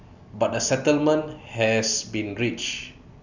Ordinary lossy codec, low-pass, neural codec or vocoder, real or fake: none; 7.2 kHz; none; real